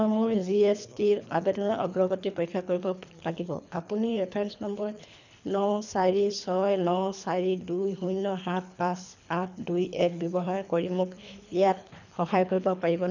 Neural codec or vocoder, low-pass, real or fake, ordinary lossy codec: codec, 24 kHz, 3 kbps, HILCodec; 7.2 kHz; fake; none